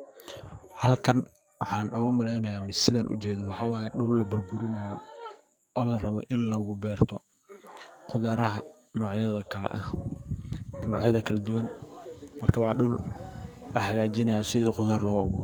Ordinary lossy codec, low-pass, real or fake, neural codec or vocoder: none; none; fake; codec, 44.1 kHz, 2.6 kbps, SNAC